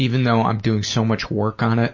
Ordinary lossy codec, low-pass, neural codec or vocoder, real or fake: MP3, 32 kbps; 7.2 kHz; none; real